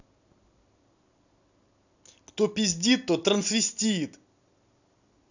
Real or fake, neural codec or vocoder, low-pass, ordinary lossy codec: real; none; 7.2 kHz; none